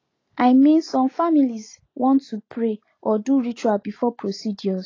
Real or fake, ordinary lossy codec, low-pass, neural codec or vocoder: real; AAC, 32 kbps; 7.2 kHz; none